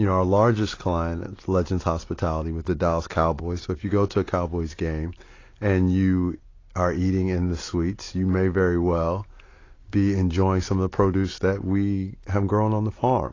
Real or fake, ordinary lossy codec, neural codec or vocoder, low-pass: real; AAC, 32 kbps; none; 7.2 kHz